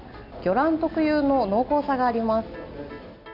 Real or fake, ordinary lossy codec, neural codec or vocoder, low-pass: real; none; none; 5.4 kHz